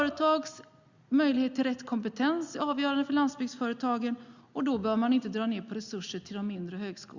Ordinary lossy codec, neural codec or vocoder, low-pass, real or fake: Opus, 64 kbps; none; 7.2 kHz; real